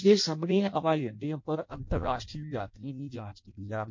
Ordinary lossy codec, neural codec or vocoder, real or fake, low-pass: MP3, 48 kbps; codec, 16 kHz in and 24 kHz out, 0.6 kbps, FireRedTTS-2 codec; fake; 7.2 kHz